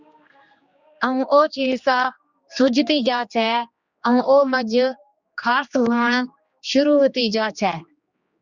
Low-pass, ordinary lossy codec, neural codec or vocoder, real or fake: 7.2 kHz; Opus, 64 kbps; codec, 16 kHz, 2 kbps, X-Codec, HuBERT features, trained on general audio; fake